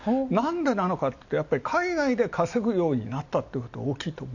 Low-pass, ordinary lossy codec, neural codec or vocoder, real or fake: 7.2 kHz; none; none; real